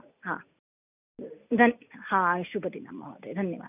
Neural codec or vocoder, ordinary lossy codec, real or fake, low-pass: none; none; real; 3.6 kHz